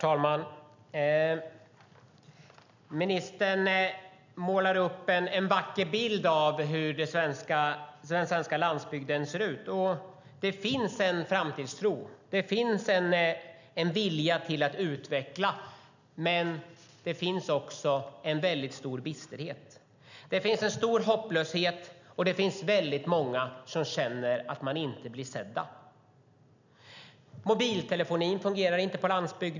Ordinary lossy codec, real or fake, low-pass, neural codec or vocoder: none; real; 7.2 kHz; none